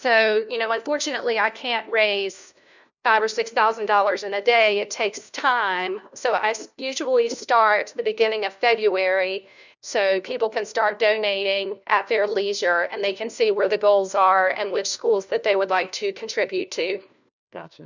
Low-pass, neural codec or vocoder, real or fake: 7.2 kHz; codec, 16 kHz, 1 kbps, FunCodec, trained on LibriTTS, 50 frames a second; fake